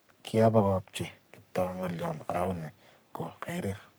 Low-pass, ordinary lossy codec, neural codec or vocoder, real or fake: none; none; codec, 44.1 kHz, 3.4 kbps, Pupu-Codec; fake